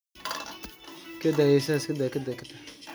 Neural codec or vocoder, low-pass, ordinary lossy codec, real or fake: none; none; none; real